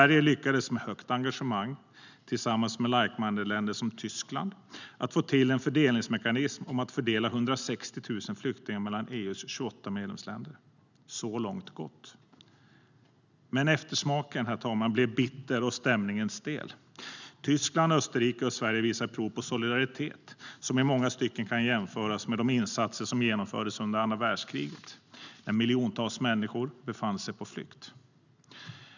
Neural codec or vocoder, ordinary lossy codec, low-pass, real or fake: none; none; 7.2 kHz; real